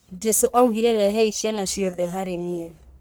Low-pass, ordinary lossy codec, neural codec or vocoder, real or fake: none; none; codec, 44.1 kHz, 1.7 kbps, Pupu-Codec; fake